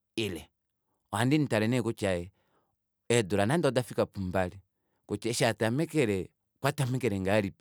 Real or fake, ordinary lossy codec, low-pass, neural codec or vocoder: real; none; none; none